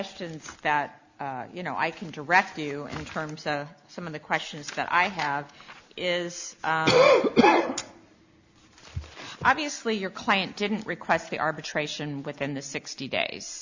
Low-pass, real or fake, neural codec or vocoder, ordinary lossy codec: 7.2 kHz; real; none; Opus, 64 kbps